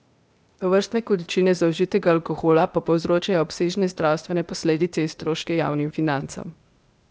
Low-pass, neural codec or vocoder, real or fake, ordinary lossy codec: none; codec, 16 kHz, 0.8 kbps, ZipCodec; fake; none